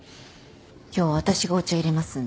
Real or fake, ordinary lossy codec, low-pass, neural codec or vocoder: real; none; none; none